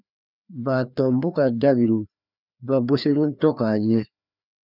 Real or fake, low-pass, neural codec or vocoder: fake; 5.4 kHz; codec, 16 kHz, 2 kbps, FreqCodec, larger model